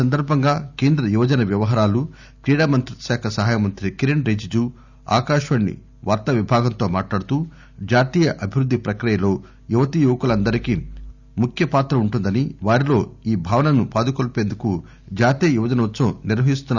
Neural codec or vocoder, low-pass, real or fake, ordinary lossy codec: none; 7.2 kHz; real; none